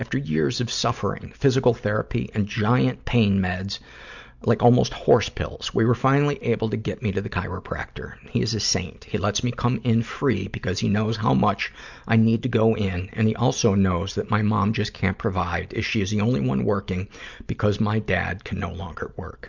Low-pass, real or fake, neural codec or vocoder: 7.2 kHz; real; none